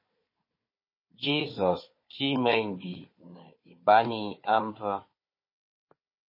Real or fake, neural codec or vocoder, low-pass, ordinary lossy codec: fake; codec, 16 kHz, 4 kbps, FunCodec, trained on Chinese and English, 50 frames a second; 5.4 kHz; MP3, 24 kbps